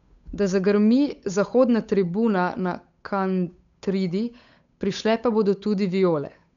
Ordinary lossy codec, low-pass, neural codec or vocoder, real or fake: none; 7.2 kHz; codec, 16 kHz, 8 kbps, FunCodec, trained on Chinese and English, 25 frames a second; fake